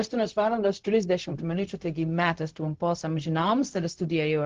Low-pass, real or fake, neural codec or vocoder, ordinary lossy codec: 7.2 kHz; fake; codec, 16 kHz, 0.4 kbps, LongCat-Audio-Codec; Opus, 16 kbps